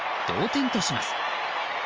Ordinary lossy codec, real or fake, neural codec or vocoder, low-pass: Opus, 24 kbps; real; none; 7.2 kHz